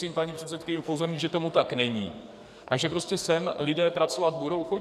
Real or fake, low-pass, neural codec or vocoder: fake; 14.4 kHz; codec, 44.1 kHz, 2.6 kbps, SNAC